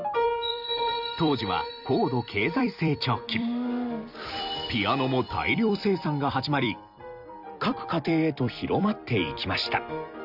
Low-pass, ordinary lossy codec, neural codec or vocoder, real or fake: 5.4 kHz; none; vocoder, 44.1 kHz, 128 mel bands every 256 samples, BigVGAN v2; fake